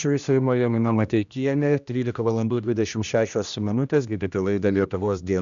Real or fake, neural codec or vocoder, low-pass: fake; codec, 16 kHz, 1 kbps, X-Codec, HuBERT features, trained on general audio; 7.2 kHz